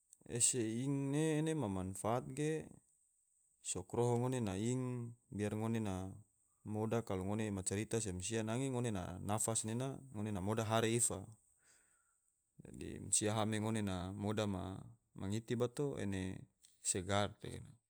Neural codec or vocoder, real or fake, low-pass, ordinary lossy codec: none; real; none; none